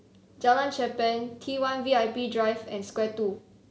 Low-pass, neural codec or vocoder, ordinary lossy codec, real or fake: none; none; none; real